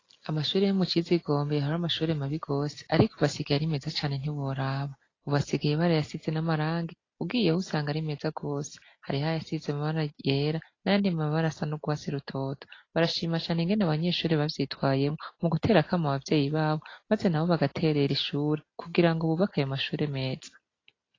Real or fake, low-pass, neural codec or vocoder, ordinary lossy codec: real; 7.2 kHz; none; AAC, 32 kbps